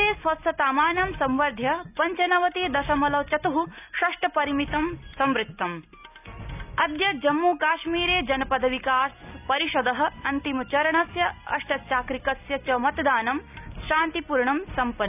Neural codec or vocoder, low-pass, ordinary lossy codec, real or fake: none; 3.6 kHz; none; real